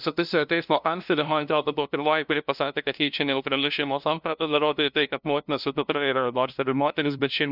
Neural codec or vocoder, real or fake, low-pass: codec, 16 kHz, 0.5 kbps, FunCodec, trained on LibriTTS, 25 frames a second; fake; 5.4 kHz